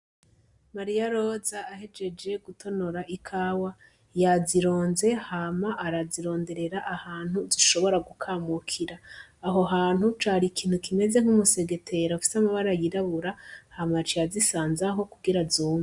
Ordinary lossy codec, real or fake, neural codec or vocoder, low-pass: Opus, 64 kbps; real; none; 10.8 kHz